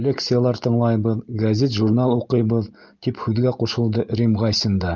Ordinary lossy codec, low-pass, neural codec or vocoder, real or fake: Opus, 24 kbps; 7.2 kHz; none; real